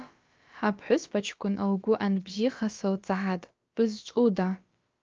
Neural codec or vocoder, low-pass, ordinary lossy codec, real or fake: codec, 16 kHz, about 1 kbps, DyCAST, with the encoder's durations; 7.2 kHz; Opus, 24 kbps; fake